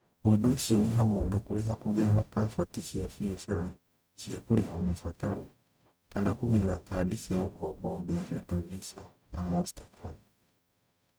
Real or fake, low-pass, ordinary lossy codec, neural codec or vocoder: fake; none; none; codec, 44.1 kHz, 0.9 kbps, DAC